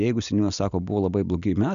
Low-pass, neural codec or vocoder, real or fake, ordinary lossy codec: 7.2 kHz; none; real; MP3, 96 kbps